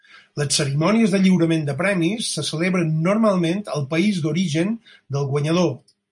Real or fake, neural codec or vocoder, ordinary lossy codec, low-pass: real; none; MP3, 48 kbps; 10.8 kHz